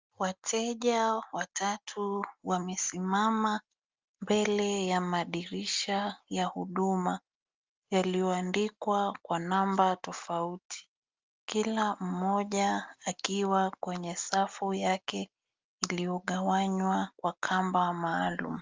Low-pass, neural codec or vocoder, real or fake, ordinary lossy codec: 7.2 kHz; none; real; Opus, 24 kbps